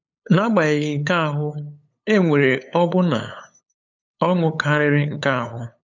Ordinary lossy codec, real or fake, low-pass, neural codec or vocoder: none; fake; 7.2 kHz; codec, 16 kHz, 8 kbps, FunCodec, trained on LibriTTS, 25 frames a second